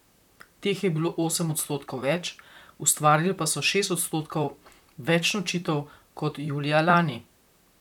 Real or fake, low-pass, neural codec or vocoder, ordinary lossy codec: fake; 19.8 kHz; vocoder, 44.1 kHz, 128 mel bands, Pupu-Vocoder; none